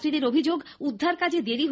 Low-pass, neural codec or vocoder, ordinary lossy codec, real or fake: none; none; none; real